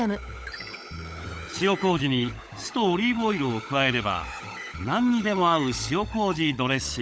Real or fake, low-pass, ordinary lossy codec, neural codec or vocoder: fake; none; none; codec, 16 kHz, 16 kbps, FunCodec, trained on LibriTTS, 50 frames a second